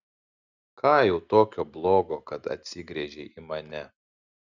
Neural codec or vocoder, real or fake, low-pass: none; real; 7.2 kHz